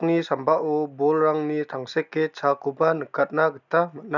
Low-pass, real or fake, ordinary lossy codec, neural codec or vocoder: 7.2 kHz; real; none; none